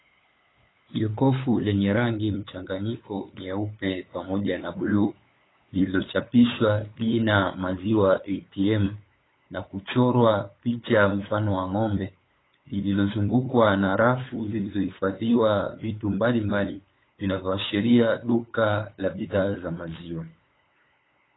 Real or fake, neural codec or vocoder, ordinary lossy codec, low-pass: fake; codec, 16 kHz, 8 kbps, FunCodec, trained on LibriTTS, 25 frames a second; AAC, 16 kbps; 7.2 kHz